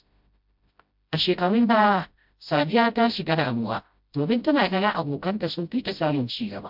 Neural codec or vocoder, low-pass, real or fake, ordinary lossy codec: codec, 16 kHz, 0.5 kbps, FreqCodec, smaller model; 5.4 kHz; fake; MP3, 48 kbps